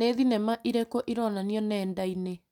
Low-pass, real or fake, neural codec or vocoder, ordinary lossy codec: 19.8 kHz; real; none; none